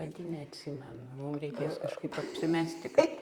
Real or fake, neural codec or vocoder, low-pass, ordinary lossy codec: fake; vocoder, 44.1 kHz, 128 mel bands, Pupu-Vocoder; 19.8 kHz; Opus, 64 kbps